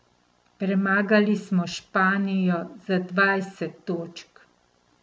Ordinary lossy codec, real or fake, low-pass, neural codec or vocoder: none; real; none; none